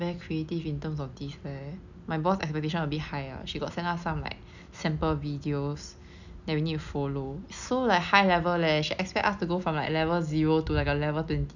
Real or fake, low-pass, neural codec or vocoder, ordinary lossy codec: real; 7.2 kHz; none; none